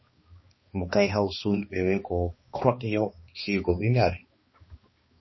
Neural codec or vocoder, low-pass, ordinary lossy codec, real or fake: codec, 16 kHz, 2 kbps, X-Codec, HuBERT features, trained on balanced general audio; 7.2 kHz; MP3, 24 kbps; fake